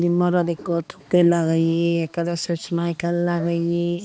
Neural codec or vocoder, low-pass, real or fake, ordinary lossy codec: codec, 16 kHz, 2 kbps, X-Codec, HuBERT features, trained on balanced general audio; none; fake; none